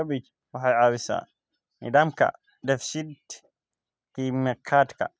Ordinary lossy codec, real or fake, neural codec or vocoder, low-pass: none; real; none; none